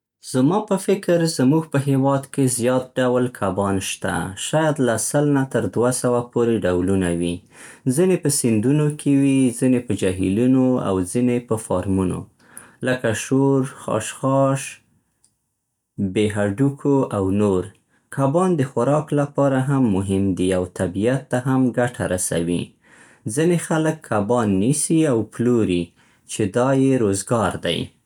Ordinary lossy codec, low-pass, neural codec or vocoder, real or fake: none; 19.8 kHz; none; real